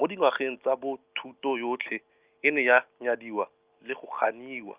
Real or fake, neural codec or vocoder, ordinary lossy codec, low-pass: real; none; Opus, 24 kbps; 3.6 kHz